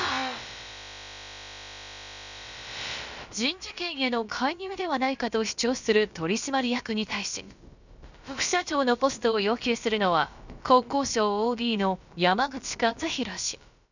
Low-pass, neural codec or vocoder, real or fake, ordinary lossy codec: 7.2 kHz; codec, 16 kHz, about 1 kbps, DyCAST, with the encoder's durations; fake; none